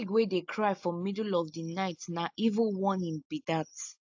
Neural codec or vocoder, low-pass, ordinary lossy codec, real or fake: none; 7.2 kHz; AAC, 48 kbps; real